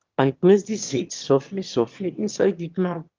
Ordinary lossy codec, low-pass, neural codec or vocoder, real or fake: Opus, 32 kbps; 7.2 kHz; autoencoder, 22.05 kHz, a latent of 192 numbers a frame, VITS, trained on one speaker; fake